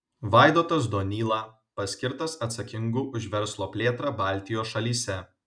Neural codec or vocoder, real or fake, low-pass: none; real; 9.9 kHz